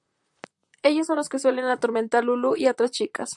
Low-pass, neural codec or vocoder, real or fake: 10.8 kHz; vocoder, 44.1 kHz, 128 mel bands, Pupu-Vocoder; fake